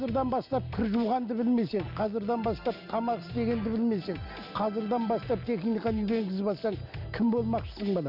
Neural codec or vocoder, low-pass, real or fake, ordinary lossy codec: none; 5.4 kHz; real; none